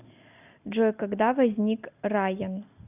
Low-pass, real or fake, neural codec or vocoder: 3.6 kHz; real; none